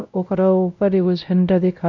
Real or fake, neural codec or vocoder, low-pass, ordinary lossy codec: fake; codec, 16 kHz, 0.5 kbps, X-Codec, WavLM features, trained on Multilingual LibriSpeech; 7.2 kHz; none